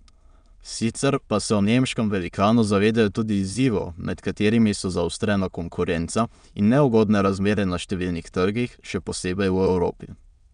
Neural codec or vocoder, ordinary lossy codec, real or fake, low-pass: autoencoder, 22.05 kHz, a latent of 192 numbers a frame, VITS, trained on many speakers; MP3, 96 kbps; fake; 9.9 kHz